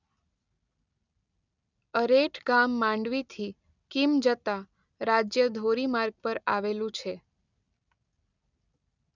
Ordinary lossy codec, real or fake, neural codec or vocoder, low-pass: none; real; none; 7.2 kHz